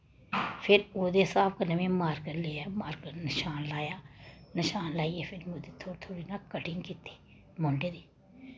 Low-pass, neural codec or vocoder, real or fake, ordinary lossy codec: none; none; real; none